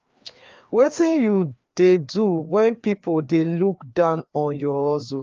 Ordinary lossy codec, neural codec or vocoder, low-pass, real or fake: Opus, 32 kbps; codec, 16 kHz, 2 kbps, FreqCodec, larger model; 7.2 kHz; fake